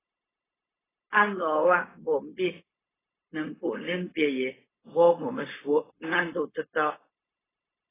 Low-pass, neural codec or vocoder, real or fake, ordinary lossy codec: 3.6 kHz; codec, 16 kHz, 0.4 kbps, LongCat-Audio-Codec; fake; AAC, 16 kbps